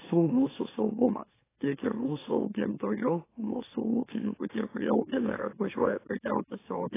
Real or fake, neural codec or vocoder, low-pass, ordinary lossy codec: fake; autoencoder, 44.1 kHz, a latent of 192 numbers a frame, MeloTTS; 3.6 kHz; AAC, 16 kbps